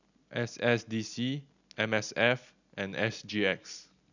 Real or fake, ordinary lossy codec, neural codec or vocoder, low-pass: real; none; none; 7.2 kHz